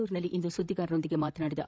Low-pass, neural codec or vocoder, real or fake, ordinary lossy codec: none; codec, 16 kHz, 8 kbps, FreqCodec, larger model; fake; none